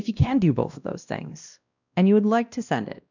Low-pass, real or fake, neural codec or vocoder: 7.2 kHz; fake; codec, 16 kHz, 1 kbps, X-Codec, WavLM features, trained on Multilingual LibriSpeech